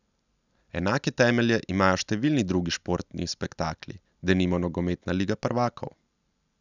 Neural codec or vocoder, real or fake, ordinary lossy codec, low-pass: none; real; none; 7.2 kHz